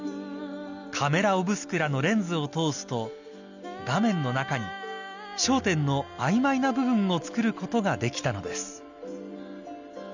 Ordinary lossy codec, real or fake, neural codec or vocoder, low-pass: none; real; none; 7.2 kHz